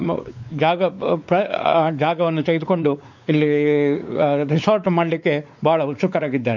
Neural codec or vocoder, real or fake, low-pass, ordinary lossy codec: codec, 16 kHz, 4 kbps, X-Codec, WavLM features, trained on Multilingual LibriSpeech; fake; 7.2 kHz; none